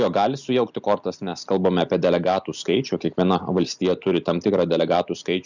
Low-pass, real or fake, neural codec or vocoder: 7.2 kHz; real; none